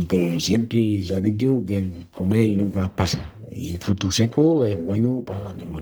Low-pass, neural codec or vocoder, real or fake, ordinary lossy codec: none; codec, 44.1 kHz, 1.7 kbps, Pupu-Codec; fake; none